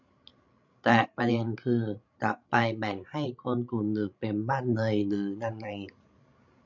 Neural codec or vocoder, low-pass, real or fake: codec, 16 kHz, 16 kbps, FreqCodec, larger model; 7.2 kHz; fake